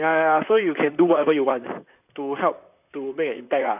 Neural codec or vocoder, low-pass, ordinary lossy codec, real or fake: vocoder, 44.1 kHz, 128 mel bands, Pupu-Vocoder; 3.6 kHz; none; fake